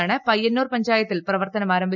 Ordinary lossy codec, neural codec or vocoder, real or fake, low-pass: none; none; real; 7.2 kHz